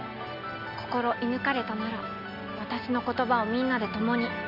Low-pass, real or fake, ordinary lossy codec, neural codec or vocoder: 5.4 kHz; real; none; none